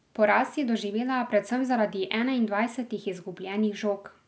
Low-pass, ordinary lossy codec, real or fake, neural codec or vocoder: none; none; real; none